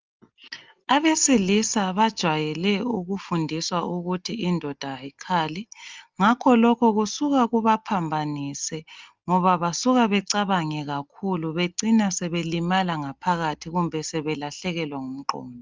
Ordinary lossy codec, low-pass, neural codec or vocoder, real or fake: Opus, 32 kbps; 7.2 kHz; none; real